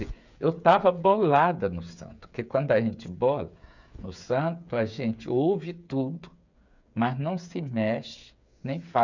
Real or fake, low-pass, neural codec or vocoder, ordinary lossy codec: fake; 7.2 kHz; codec, 16 kHz, 8 kbps, FreqCodec, smaller model; none